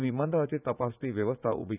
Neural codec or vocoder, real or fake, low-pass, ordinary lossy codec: vocoder, 44.1 kHz, 80 mel bands, Vocos; fake; 3.6 kHz; none